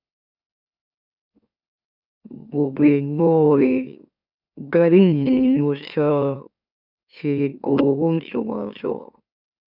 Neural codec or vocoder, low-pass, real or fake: autoencoder, 44.1 kHz, a latent of 192 numbers a frame, MeloTTS; 5.4 kHz; fake